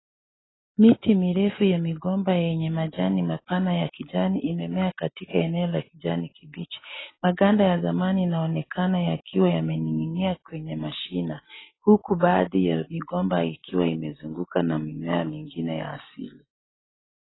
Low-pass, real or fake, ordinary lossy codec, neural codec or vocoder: 7.2 kHz; real; AAC, 16 kbps; none